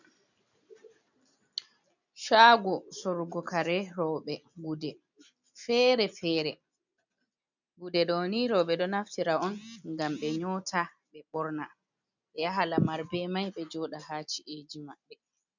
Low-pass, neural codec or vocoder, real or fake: 7.2 kHz; none; real